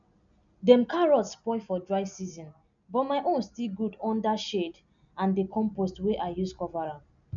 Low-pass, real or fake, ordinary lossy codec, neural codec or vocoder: 7.2 kHz; real; none; none